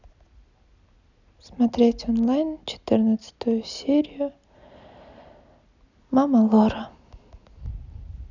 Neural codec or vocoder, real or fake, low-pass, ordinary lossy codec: none; real; 7.2 kHz; none